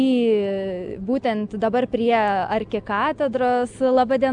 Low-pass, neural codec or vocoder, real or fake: 9.9 kHz; none; real